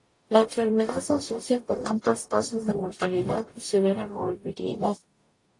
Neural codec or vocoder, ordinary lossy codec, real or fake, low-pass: codec, 44.1 kHz, 0.9 kbps, DAC; AAC, 48 kbps; fake; 10.8 kHz